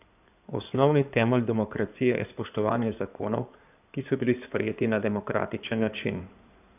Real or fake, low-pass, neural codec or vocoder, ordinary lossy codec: fake; 3.6 kHz; codec, 16 kHz in and 24 kHz out, 2.2 kbps, FireRedTTS-2 codec; none